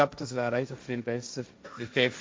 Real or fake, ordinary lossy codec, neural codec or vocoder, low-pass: fake; none; codec, 16 kHz, 1.1 kbps, Voila-Tokenizer; none